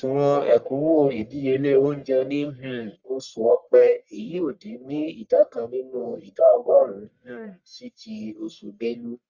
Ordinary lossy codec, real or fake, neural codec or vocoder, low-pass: Opus, 64 kbps; fake; codec, 44.1 kHz, 1.7 kbps, Pupu-Codec; 7.2 kHz